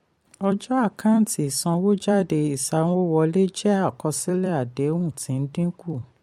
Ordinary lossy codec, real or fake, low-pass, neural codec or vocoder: MP3, 64 kbps; fake; 19.8 kHz; vocoder, 44.1 kHz, 128 mel bands every 256 samples, BigVGAN v2